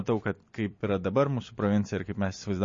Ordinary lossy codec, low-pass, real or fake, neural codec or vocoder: MP3, 32 kbps; 7.2 kHz; real; none